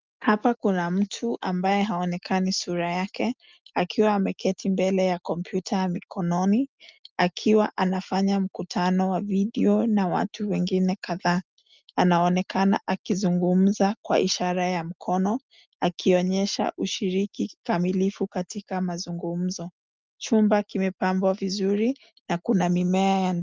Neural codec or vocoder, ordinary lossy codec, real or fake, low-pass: none; Opus, 24 kbps; real; 7.2 kHz